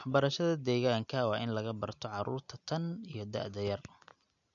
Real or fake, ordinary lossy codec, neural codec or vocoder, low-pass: real; none; none; 7.2 kHz